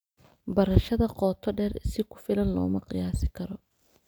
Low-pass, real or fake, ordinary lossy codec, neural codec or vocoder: none; real; none; none